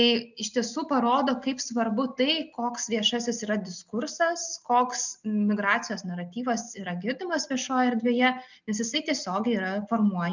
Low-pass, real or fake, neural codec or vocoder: 7.2 kHz; real; none